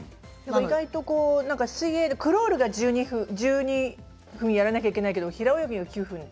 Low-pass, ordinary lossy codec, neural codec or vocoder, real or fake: none; none; none; real